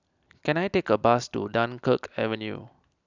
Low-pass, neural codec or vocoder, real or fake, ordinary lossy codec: 7.2 kHz; none; real; none